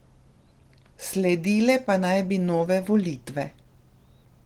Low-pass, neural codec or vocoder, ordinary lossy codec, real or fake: 19.8 kHz; none; Opus, 16 kbps; real